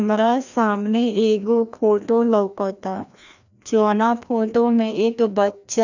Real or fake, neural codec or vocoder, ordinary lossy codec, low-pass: fake; codec, 16 kHz, 1 kbps, FreqCodec, larger model; none; 7.2 kHz